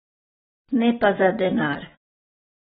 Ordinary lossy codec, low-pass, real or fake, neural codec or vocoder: AAC, 16 kbps; 7.2 kHz; real; none